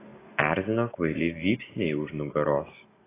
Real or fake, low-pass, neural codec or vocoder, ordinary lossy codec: real; 3.6 kHz; none; AAC, 16 kbps